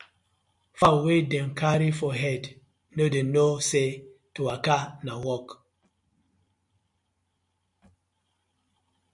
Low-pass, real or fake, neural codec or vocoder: 10.8 kHz; real; none